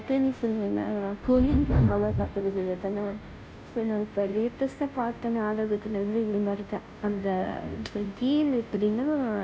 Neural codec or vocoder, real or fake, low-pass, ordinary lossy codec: codec, 16 kHz, 0.5 kbps, FunCodec, trained on Chinese and English, 25 frames a second; fake; none; none